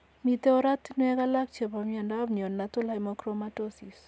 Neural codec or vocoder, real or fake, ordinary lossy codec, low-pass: none; real; none; none